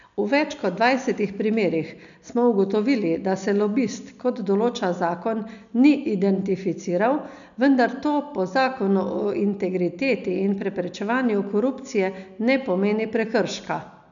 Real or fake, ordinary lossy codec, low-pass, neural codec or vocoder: real; none; 7.2 kHz; none